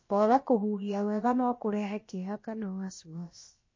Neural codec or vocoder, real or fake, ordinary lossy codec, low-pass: codec, 16 kHz, about 1 kbps, DyCAST, with the encoder's durations; fake; MP3, 32 kbps; 7.2 kHz